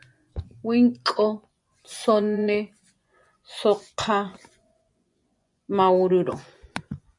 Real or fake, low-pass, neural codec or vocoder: fake; 10.8 kHz; vocoder, 24 kHz, 100 mel bands, Vocos